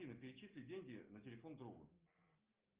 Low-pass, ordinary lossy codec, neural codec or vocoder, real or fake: 3.6 kHz; Opus, 24 kbps; none; real